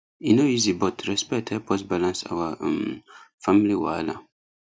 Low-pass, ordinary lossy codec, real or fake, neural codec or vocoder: none; none; real; none